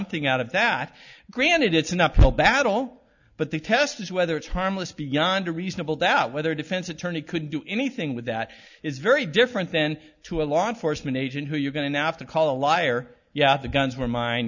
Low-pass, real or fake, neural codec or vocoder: 7.2 kHz; real; none